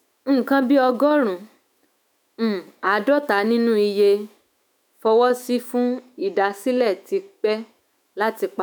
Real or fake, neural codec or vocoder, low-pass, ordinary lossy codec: fake; autoencoder, 48 kHz, 128 numbers a frame, DAC-VAE, trained on Japanese speech; none; none